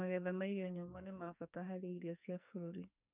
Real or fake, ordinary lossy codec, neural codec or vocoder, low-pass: fake; none; codec, 44.1 kHz, 2.6 kbps, SNAC; 3.6 kHz